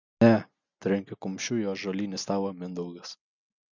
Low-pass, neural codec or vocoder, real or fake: 7.2 kHz; none; real